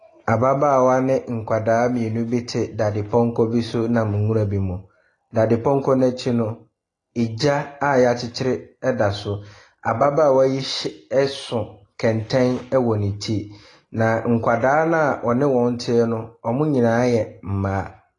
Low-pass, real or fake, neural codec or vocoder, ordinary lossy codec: 10.8 kHz; real; none; AAC, 32 kbps